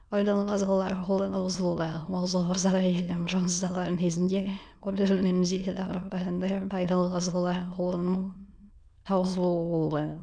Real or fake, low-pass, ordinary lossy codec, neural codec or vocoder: fake; 9.9 kHz; none; autoencoder, 22.05 kHz, a latent of 192 numbers a frame, VITS, trained on many speakers